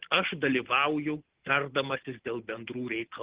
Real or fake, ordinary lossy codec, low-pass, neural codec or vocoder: real; Opus, 16 kbps; 3.6 kHz; none